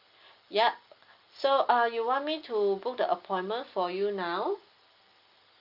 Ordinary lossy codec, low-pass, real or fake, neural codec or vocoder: Opus, 24 kbps; 5.4 kHz; real; none